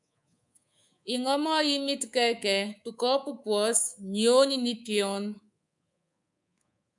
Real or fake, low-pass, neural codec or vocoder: fake; 10.8 kHz; codec, 24 kHz, 3.1 kbps, DualCodec